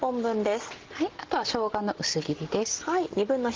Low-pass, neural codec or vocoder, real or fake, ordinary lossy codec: 7.2 kHz; none; real; Opus, 16 kbps